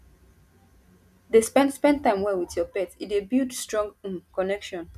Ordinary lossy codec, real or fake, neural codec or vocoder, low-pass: none; real; none; 14.4 kHz